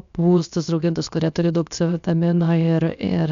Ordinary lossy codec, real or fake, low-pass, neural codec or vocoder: MP3, 64 kbps; fake; 7.2 kHz; codec, 16 kHz, 0.7 kbps, FocalCodec